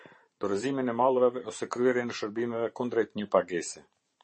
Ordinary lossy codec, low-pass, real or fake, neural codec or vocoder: MP3, 32 kbps; 10.8 kHz; fake; vocoder, 44.1 kHz, 128 mel bands every 512 samples, BigVGAN v2